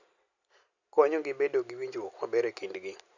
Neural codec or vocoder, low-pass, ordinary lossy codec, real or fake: none; 7.2 kHz; Opus, 64 kbps; real